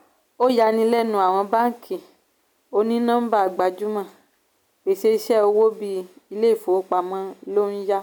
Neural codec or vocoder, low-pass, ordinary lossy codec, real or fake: none; none; none; real